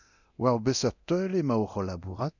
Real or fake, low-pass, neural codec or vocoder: fake; 7.2 kHz; codec, 24 kHz, 0.9 kbps, DualCodec